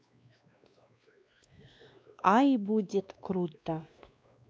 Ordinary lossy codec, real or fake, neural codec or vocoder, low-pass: none; fake; codec, 16 kHz, 2 kbps, X-Codec, WavLM features, trained on Multilingual LibriSpeech; none